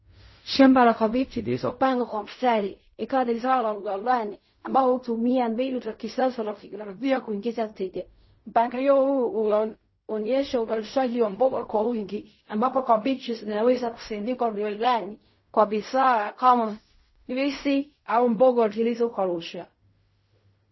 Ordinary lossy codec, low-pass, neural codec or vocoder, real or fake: MP3, 24 kbps; 7.2 kHz; codec, 16 kHz in and 24 kHz out, 0.4 kbps, LongCat-Audio-Codec, fine tuned four codebook decoder; fake